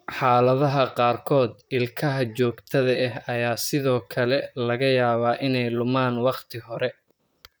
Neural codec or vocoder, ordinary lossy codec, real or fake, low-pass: vocoder, 44.1 kHz, 128 mel bands, Pupu-Vocoder; none; fake; none